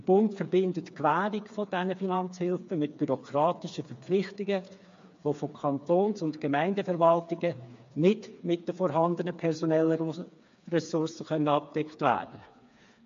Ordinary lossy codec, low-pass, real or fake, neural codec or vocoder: MP3, 48 kbps; 7.2 kHz; fake; codec, 16 kHz, 4 kbps, FreqCodec, smaller model